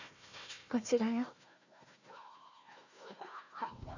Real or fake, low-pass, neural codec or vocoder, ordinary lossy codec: fake; 7.2 kHz; codec, 16 kHz, 1 kbps, FunCodec, trained on Chinese and English, 50 frames a second; none